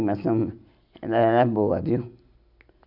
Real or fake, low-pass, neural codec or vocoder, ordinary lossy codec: real; 5.4 kHz; none; none